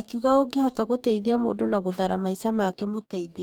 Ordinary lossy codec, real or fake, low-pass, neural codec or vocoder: none; fake; 19.8 kHz; codec, 44.1 kHz, 2.6 kbps, DAC